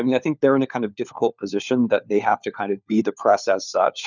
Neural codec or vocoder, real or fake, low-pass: codec, 16 kHz, 4 kbps, FunCodec, trained on LibriTTS, 50 frames a second; fake; 7.2 kHz